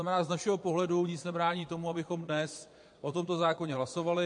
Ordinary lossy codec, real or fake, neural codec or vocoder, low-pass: MP3, 48 kbps; fake; vocoder, 22.05 kHz, 80 mel bands, Vocos; 9.9 kHz